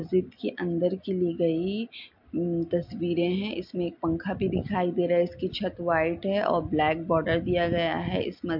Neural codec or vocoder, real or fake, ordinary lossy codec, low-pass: none; real; MP3, 48 kbps; 5.4 kHz